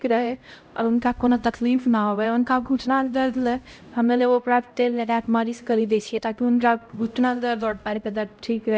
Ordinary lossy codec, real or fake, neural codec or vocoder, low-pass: none; fake; codec, 16 kHz, 0.5 kbps, X-Codec, HuBERT features, trained on LibriSpeech; none